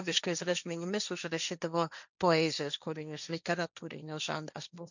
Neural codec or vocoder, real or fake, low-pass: codec, 16 kHz, 1.1 kbps, Voila-Tokenizer; fake; 7.2 kHz